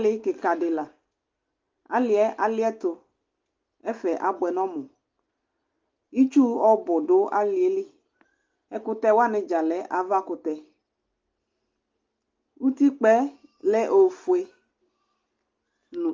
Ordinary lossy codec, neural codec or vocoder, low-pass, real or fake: Opus, 32 kbps; none; 7.2 kHz; real